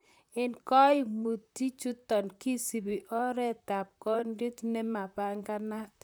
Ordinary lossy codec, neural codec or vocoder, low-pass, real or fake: none; vocoder, 44.1 kHz, 128 mel bands, Pupu-Vocoder; none; fake